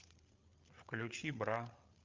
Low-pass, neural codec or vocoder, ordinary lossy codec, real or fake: 7.2 kHz; codec, 16 kHz, 8 kbps, FreqCodec, larger model; Opus, 24 kbps; fake